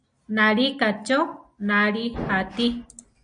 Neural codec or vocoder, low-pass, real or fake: none; 9.9 kHz; real